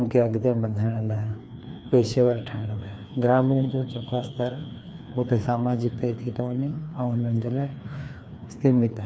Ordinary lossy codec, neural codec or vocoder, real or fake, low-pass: none; codec, 16 kHz, 2 kbps, FreqCodec, larger model; fake; none